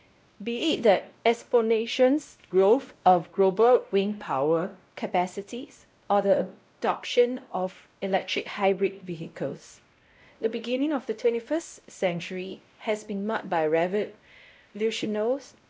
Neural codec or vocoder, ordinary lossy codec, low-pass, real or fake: codec, 16 kHz, 0.5 kbps, X-Codec, WavLM features, trained on Multilingual LibriSpeech; none; none; fake